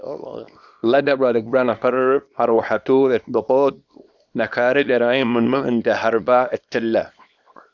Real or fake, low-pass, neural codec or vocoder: fake; 7.2 kHz; codec, 24 kHz, 0.9 kbps, WavTokenizer, small release